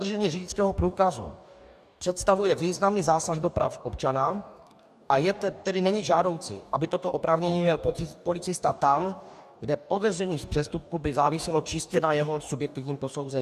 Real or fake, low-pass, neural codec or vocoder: fake; 14.4 kHz; codec, 44.1 kHz, 2.6 kbps, DAC